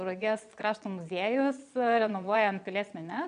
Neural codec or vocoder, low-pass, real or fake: vocoder, 22.05 kHz, 80 mel bands, Vocos; 9.9 kHz; fake